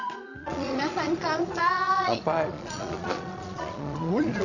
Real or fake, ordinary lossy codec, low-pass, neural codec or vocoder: fake; none; 7.2 kHz; vocoder, 22.05 kHz, 80 mel bands, Vocos